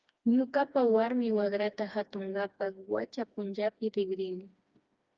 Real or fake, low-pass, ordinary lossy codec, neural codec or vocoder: fake; 7.2 kHz; Opus, 24 kbps; codec, 16 kHz, 2 kbps, FreqCodec, smaller model